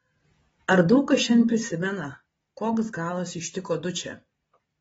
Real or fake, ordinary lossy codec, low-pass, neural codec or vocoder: real; AAC, 24 kbps; 19.8 kHz; none